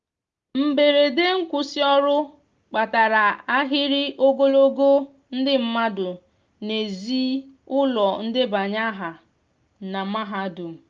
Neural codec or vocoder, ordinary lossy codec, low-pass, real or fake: none; Opus, 32 kbps; 7.2 kHz; real